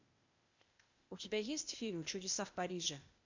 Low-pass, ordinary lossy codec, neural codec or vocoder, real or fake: 7.2 kHz; MP3, 64 kbps; codec, 16 kHz, 0.8 kbps, ZipCodec; fake